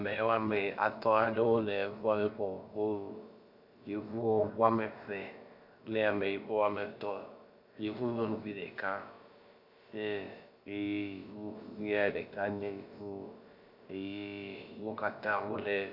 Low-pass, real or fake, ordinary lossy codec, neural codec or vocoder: 5.4 kHz; fake; Opus, 64 kbps; codec, 16 kHz, about 1 kbps, DyCAST, with the encoder's durations